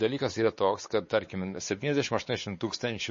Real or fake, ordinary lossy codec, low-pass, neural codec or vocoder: fake; MP3, 32 kbps; 7.2 kHz; codec, 16 kHz, 4 kbps, X-Codec, WavLM features, trained on Multilingual LibriSpeech